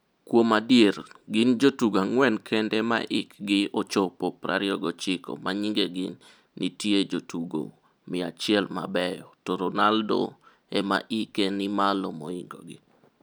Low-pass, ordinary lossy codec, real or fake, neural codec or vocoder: none; none; real; none